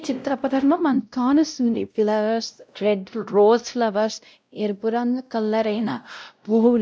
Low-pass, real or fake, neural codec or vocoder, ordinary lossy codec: none; fake; codec, 16 kHz, 0.5 kbps, X-Codec, WavLM features, trained on Multilingual LibriSpeech; none